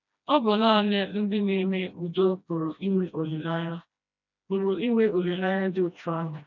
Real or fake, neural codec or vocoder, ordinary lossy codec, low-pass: fake; codec, 16 kHz, 1 kbps, FreqCodec, smaller model; none; 7.2 kHz